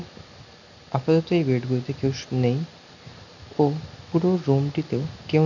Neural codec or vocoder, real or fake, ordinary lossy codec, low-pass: none; real; none; 7.2 kHz